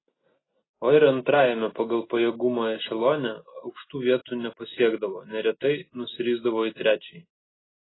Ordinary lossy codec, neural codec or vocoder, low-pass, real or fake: AAC, 16 kbps; none; 7.2 kHz; real